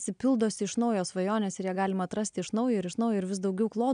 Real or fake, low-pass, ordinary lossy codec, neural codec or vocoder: real; 9.9 kHz; MP3, 96 kbps; none